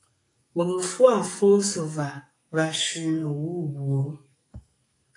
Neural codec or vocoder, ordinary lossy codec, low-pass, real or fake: codec, 44.1 kHz, 2.6 kbps, SNAC; AAC, 48 kbps; 10.8 kHz; fake